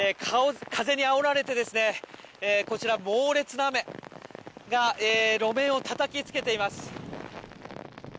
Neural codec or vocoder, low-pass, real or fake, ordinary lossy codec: none; none; real; none